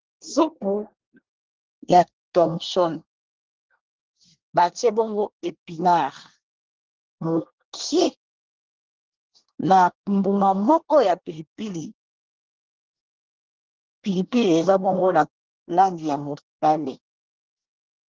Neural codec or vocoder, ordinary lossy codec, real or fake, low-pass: codec, 24 kHz, 1 kbps, SNAC; Opus, 16 kbps; fake; 7.2 kHz